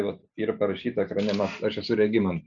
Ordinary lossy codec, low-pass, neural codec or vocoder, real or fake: MP3, 96 kbps; 7.2 kHz; none; real